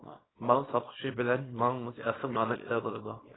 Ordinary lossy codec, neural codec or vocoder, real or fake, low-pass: AAC, 16 kbps; codec, 24 kHz, 0.9 kbps, WavTokenizer, small release; fake; 7.2 kHz